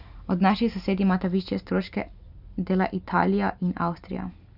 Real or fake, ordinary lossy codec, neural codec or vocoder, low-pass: real; none; none; 5.4 kHz